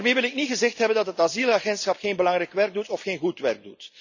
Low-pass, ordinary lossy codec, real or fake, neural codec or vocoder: 7.2 kHz; none; real; none